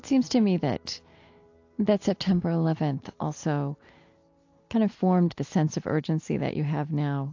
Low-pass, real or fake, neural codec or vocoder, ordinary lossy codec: 7.2 kHz; real; none; AAC, 48 kbps